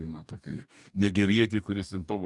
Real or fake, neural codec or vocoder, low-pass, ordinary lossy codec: fake; codec, 44.1 kHz, 2.6 kbps, DAC; 10.8 kHz; MP3, 96 kbps